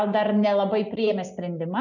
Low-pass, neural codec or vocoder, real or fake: 7.2 kHz; none; real